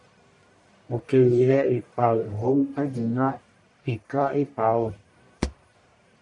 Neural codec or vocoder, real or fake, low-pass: codec, 44.1 kHz, 1.7 kbps, Pupu-Codec; fake; 10.8 kHz